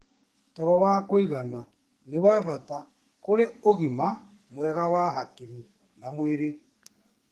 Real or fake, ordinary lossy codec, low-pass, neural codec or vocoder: fake; Opus, 24 kbps; 14.4 kHz; codec, 44.1 kHz, 2.6 kbps, SNAC